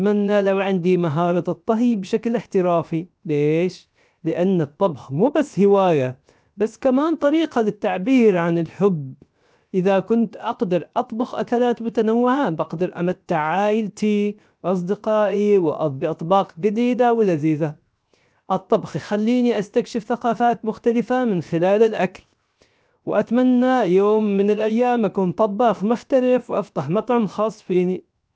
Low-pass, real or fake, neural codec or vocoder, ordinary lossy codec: none; fake; codec, 16 kHz, 0.7 kbps, FocalCodec; none